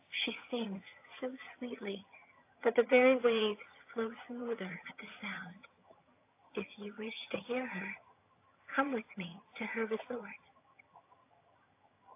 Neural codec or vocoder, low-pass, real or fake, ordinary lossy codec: vocoder, 22.05 kHz, 80 mel bands, HiFi-GAN; 3.6 kHz; fake; AAC, 24 kbps